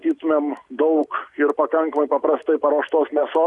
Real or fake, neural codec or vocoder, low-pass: real; none; 10.8 kHz